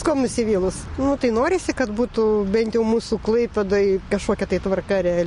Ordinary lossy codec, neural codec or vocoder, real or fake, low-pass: MP3, 48 kbps; none; real; 10.8 kHz